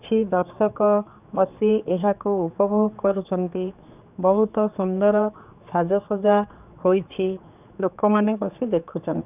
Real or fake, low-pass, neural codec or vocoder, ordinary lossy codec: fake; 3.6 kHz; codec, 16 kHz, 4 kbps, X-Codec, HuBERT features, trained on general audio; none